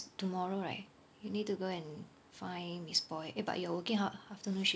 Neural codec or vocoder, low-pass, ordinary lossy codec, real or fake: none; none; none; real